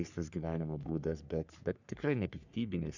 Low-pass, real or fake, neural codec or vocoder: 7.2 kHz; fake; codec, 44.1 kHz, 3.4 kbps, Pupu-Codec